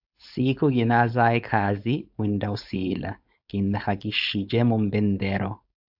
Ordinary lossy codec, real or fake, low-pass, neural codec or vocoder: AAC, 48 kbps; fake; 5.4 kHz; codec, 16 kHz, 4.8 kbps, FACodec